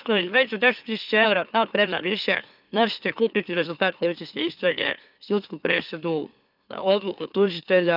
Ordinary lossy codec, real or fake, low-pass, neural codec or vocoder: none; fake; 5.4 kHz; autoencoder, 44.1 kHz, a latent of 192 numbers a frame, MeloTTS